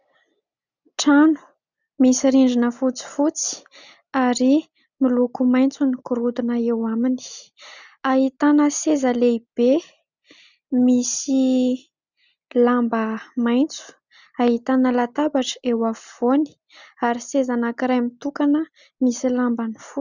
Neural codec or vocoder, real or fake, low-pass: none; real; 7.2 kHz